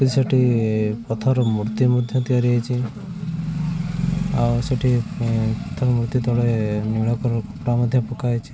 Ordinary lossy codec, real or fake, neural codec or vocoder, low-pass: none; real; none; none